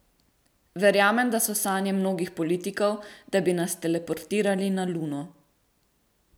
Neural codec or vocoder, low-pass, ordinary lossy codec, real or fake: none; none; none; real